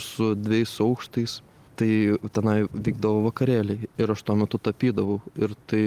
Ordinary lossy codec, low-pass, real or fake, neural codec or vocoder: Opus, 24 kbps; 14.4 kHz; real; none